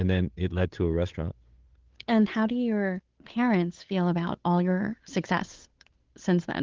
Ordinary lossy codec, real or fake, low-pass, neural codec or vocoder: Opus, 16 kbps; fake; 7.2 kHz; codec, 16 kHz, 8 kbps, FunCodec, trained on Chinese and English, 25 frames a second